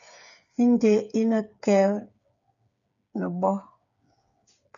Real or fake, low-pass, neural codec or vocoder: fake; 7.2 kHz; codec, 16 kHz, 8 kbps, FreqCodec, smaller model